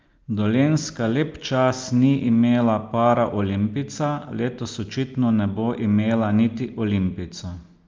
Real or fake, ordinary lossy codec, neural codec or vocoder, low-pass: real; Opus, 32 kbps; none; 7.2 kHz